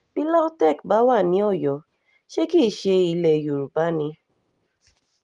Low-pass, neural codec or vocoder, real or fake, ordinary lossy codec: 10.8 kHz; none; real; Opus, 24 kbps